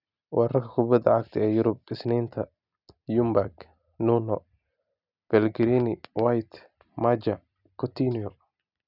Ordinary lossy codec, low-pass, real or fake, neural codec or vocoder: none; 5.4 kHz; real; none